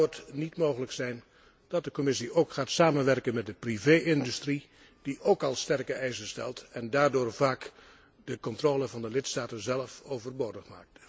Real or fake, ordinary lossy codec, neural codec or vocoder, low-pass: real; none; none; none